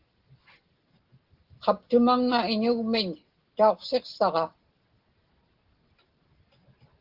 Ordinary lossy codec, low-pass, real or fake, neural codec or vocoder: Opus, 16 kbps; 5.4 kHz; real; none